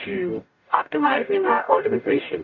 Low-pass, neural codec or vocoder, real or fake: 7.2 kHz; codec, 44.1 kHz, 0.9 kbps, DAC; fake